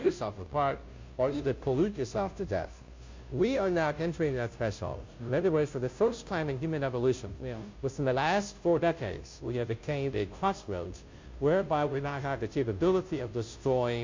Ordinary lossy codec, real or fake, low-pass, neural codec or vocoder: MP3, 48 kbps; fake; 7.2 kHz; codec, 16 kHz, 0.5 kbps, FunCodec, trained on Chinese and English, 25 frames a second